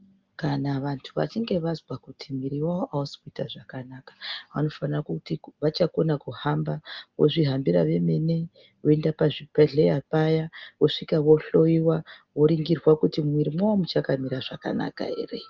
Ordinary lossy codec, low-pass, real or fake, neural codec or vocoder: Opus, 24 kbps; 7.2 kHz; real; none